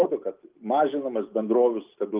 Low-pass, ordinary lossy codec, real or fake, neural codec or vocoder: 3.6 kHz; Opus, 32 kbps; real; none